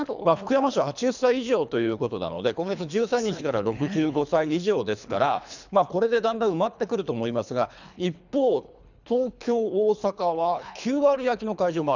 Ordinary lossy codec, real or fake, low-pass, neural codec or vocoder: none; fake; 7.2 kHz; codec, 24 kHz, 3 kbps, HILCodec